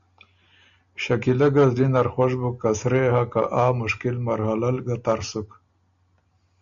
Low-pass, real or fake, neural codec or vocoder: 7.2 kHz; real; none